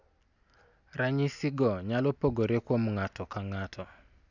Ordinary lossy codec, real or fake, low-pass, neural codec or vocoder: none; real; 7.2 kHz; none